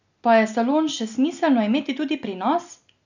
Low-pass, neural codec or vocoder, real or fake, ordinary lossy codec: 7.2 kHz; none; real; none